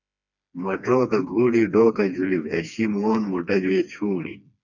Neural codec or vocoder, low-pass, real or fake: codec, 16 kHz, 2 kbps, FreqCodec, smaller model; 7.2 kHz; fake